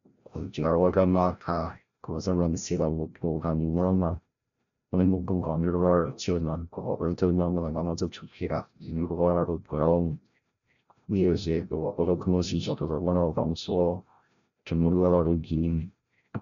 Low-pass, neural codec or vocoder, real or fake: 7.2 kHz; codec, 16 kHz, 0.5 kbps, FreqCodec, larger model; fake